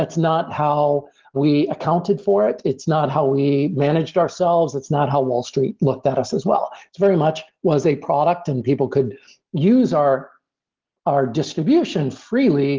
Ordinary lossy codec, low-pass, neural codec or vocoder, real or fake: Opus, 16 kbps; 7.2 kHz; none; real